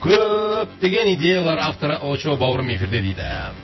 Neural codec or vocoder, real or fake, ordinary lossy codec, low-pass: vocoder, 24 kHz, 100 mel bands, Vocos; fake; MP3, 24 kbps; 7.2 kHz